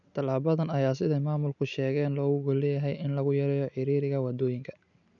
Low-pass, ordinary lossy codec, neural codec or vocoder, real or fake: 7.2 kHz; none; none; real